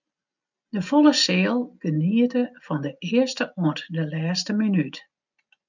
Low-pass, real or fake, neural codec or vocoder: 7.2 kHz; real; none